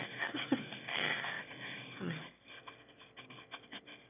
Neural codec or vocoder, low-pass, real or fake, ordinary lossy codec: autoencoder, 22.05 kHz, a latent of 192 numbers a frame, VITS, trained on one speaker; 3.6 kHz; fake; none